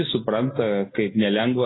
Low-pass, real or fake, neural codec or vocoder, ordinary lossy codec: 7.2 kHz; real; none; AAC, 16 kbps